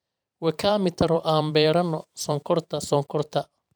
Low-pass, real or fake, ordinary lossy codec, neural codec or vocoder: none; fake; none; vocoder, 44.1 kHz, 128 mel bands every 512 samples, BigVGAN v2